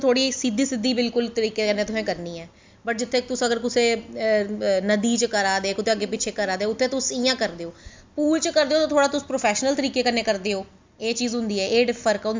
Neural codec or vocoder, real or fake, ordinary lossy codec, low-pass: none; real; MP3, 64 kbps; 7.2 kHz